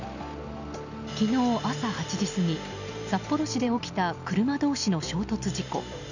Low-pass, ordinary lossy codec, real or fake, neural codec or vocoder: 7.2 kHz; none; real; none